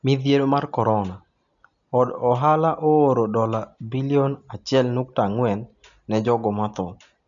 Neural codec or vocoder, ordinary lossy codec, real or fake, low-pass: none; none; real; 7.2 kHz